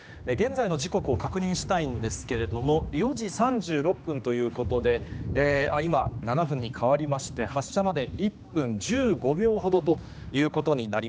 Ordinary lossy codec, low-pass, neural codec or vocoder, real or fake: none; none; codec, 16 kHz, 2 kbps, X-Codec, HuBERT features, trained on general audio; fake